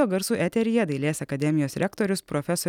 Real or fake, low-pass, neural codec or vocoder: real; 19.8 kHz; none